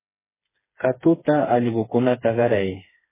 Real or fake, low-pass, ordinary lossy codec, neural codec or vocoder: fake; 3.6 kHz; MP3, 16 kbps; codec, 16 kHz, 4 kbps, FreqCodec, smaller model